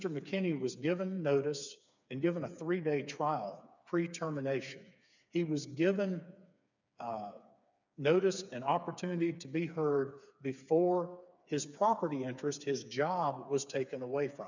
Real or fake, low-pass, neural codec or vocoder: fake; 7.2 kHz; codec, 16 kHz, 4 kbps, FreqCodec, smaller model